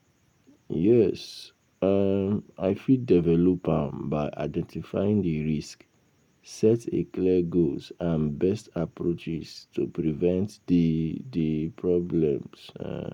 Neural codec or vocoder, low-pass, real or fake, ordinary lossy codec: none; 19.8 kHz; real; none